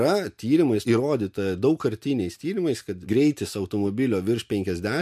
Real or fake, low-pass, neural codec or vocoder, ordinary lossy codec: real; 14.4 kHz; none; MP3, 64 kbps